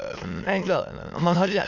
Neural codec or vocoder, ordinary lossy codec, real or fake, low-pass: autoencoder, 22.05 kHz, a latent of 192 numbers a frame, VITS, trained on many speakers; none; fake; 7.2 kHz